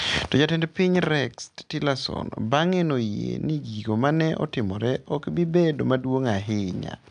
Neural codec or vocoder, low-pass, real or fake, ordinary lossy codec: none; 9.9 kHz; real; none